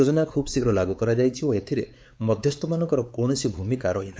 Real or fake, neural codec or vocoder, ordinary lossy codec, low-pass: fake; codec, 16 kHz, 4 kbps, X-Codec, WavLM features, trained on Multilingual LibriSpeech; none; none